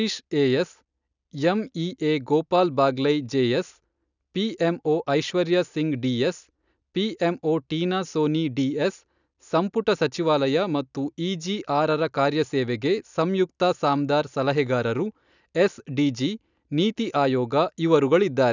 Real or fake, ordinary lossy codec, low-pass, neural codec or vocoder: real; none; 7.2 kHz; none